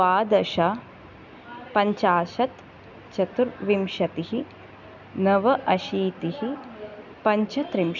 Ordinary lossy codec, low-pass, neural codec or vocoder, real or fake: none; 7.2 kHz; none; real